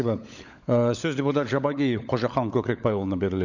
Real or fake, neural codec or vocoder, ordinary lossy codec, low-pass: fake; codec, 16 kHz, 16 kbps, FunCodec, trained on LibriTTS, 50 frames a second; none; 7.2 kHz